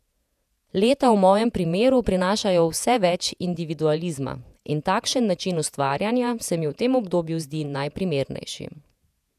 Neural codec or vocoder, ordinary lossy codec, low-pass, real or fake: vocoder, 48 kHz, 128 mel bands, Vocos; none; 14.4 kHz; fake